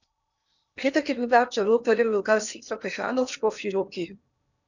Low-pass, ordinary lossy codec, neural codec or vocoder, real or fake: 7.2 kHz; none; codec, 16 kHz in and 24 kHz out, 0.8 kbps, FocalCodec, streaming, 65536 codes; fake